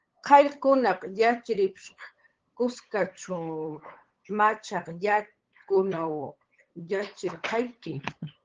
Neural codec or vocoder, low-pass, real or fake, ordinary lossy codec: codec, 16 kHz, 8 kbps, FunCodec, trained on LibriTTS, 25 frames a second; 7.2 kHz; fake; Opus, 16 kbps